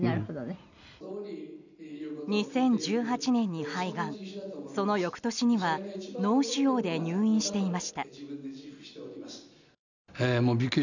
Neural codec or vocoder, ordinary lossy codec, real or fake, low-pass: none; none; real; 7.2 kHz